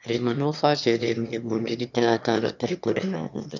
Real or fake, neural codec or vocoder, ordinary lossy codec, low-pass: fake; autoencoder, 22.05 kHz, a latent of 192 numbers a frame, VITS, trained on one speaker; none; 7.2 kHz